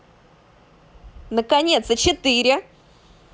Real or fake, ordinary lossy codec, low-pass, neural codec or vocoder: real; none; none; none